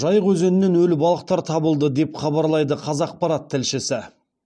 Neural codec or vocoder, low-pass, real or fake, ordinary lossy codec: none; none; real; none